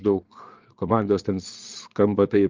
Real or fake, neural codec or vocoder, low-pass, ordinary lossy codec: fake; vocoder, 22.05 kHz, 80 mel bands, Vocos; 7.2 kHz; Opus, 24 kbps